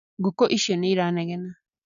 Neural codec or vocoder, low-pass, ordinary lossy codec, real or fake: none; 7.2 kHz; MP3, 96 kbps; real